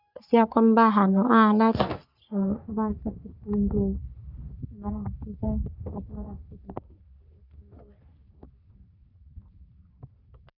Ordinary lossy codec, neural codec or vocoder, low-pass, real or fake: none; codec, 44.1 kHz, 3.4 kbps, Pupu-Codec; 5.4 kHz; fake